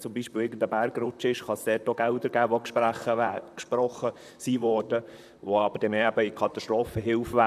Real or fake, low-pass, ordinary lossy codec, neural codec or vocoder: fake; 14.4 kHz; none; vocoder, 44.1 kHz, 128 mel bands, Pupu-Vocoder